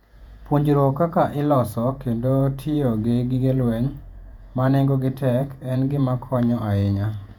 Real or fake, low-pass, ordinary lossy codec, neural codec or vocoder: fake; 19.8 kHz; MP3, 96 kbps; vocoder, 48 kHz, 128 mel bands, Vocos